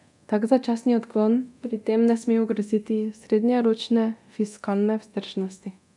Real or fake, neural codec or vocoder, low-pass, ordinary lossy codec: fake; codec, 24 kHz, 0.9 kbps, DualCodec; 10.8 kHz; none